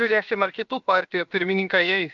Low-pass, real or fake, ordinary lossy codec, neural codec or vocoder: 7.2 kHz; fake; MP3, 64 kbps; codec, 16 kHz, 0.7 kbps, FocalCodec